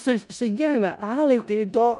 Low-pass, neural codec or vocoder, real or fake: 10.8 kHz; codec, 16 kHz in and 24 kHz out, 0.4 kbps, LongCat-Audio-Codec, four codebook decoder; fake